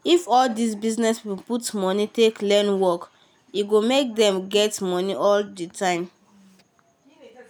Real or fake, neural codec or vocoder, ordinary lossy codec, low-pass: real; none; none; none